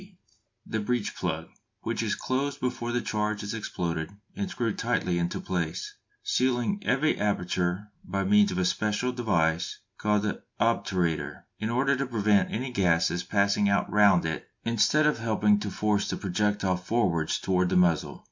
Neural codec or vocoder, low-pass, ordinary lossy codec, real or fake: none; 7.2 kHz; MP3, 64 kbps; real